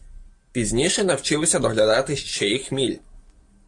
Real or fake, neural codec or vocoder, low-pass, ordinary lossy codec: real; none; 10.8 kHz; AAC, 64 kbps